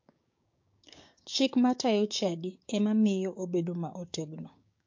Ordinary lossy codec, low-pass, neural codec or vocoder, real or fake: MP3, 48 kbps; 7.2 kHz; codec, 16 kHz, 6 kbps, DAC; fake